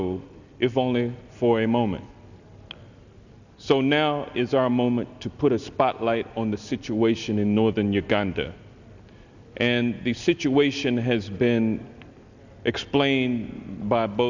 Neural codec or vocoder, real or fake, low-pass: none; real; 7.2 kHz